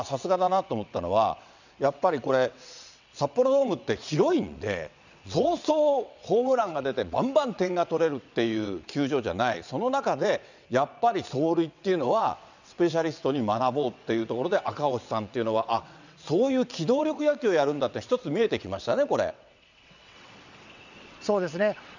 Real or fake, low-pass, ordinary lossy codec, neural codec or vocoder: fake; 7.2 kHz; none; vocoder, 22.05 kHz, 80 mel bands, WaveNeXt